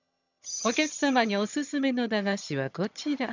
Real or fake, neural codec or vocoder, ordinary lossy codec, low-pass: fake; vocoder, 22.05 kHz, 80 mel bands, HiFi-GAN; none; 7.2 kHz